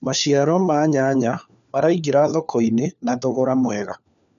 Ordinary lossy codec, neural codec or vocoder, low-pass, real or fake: none; codec, 16 kHz, 4 kbps, FunCodec, trained on LibriTTS, 50 frames a second; 7.2 kHz; fake